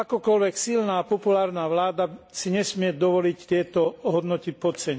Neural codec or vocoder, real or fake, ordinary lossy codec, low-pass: none; real; none; none